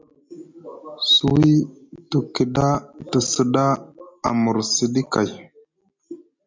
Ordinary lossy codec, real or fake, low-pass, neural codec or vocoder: MP3, 64 kbps; real; 7.2 kHz; none